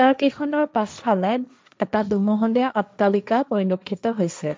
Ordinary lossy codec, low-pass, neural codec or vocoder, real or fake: none; none; codec, 16 kHz, 1.1 kbps, Voila-Tokenizer; fake